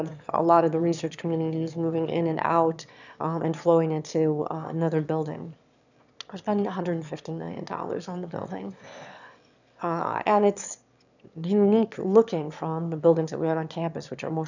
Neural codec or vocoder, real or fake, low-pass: autoencoder, 22.05 kHz, a latent of 192 numbers a frame, VITS, trained on one speaker; fake; 7.2 kHz